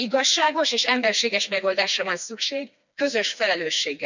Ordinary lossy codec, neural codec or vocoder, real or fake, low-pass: none; codec, 16 kHz, 2 kbps, FreqCodec, smaller model; fake; 7.2 kHz